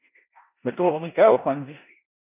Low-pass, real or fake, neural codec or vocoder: 3.6 kHz; fake; codec, 16 kHz in and 24 kHz out, 0.9 kbps, LongCat-Audio-Codec, four codebook decoder